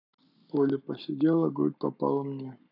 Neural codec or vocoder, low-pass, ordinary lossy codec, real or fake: codec, 44.1 kHz, 7.8 kbps, Pupu-Codec; 5.4 kHz; none; fake